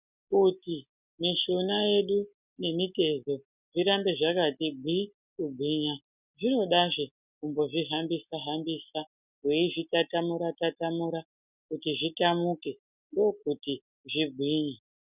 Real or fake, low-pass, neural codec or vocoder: real; 3.6 kHz; none